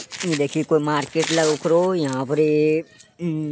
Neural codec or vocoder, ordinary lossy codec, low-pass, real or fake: none; none; none; real